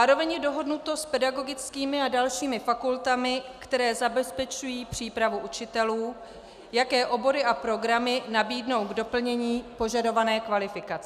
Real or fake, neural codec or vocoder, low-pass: real; none; 14.4 kHz